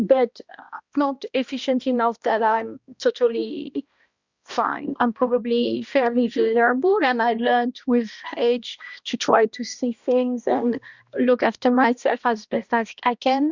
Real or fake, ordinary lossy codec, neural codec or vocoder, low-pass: fake; Opus, 64 kbps; codec, 16 kHz, 1 kbps, X-Codec, HuBERT features, trained on balanced general audio; 7.2 kHz